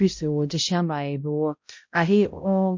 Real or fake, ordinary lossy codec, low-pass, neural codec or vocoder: fake; MP3, 48 kbps; 7.2 kHz; codec, 16 kHz, 0.5 kbps, X-Codec, HuBERT features, trained on balanced general audio